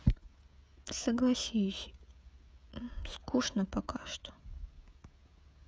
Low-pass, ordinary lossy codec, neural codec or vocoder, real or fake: none; none; codec, 16 kHz, 16 kbps, FreqCodec, smaller model; fake